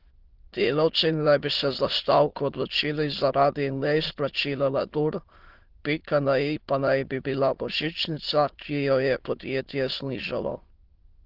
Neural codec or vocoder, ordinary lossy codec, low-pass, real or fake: autoencoder, 22.05 kHz, a latent of 192 numbers a frame, VITS, trained on many speakers; Opus, 16 kbps; 5.4 kHz; fake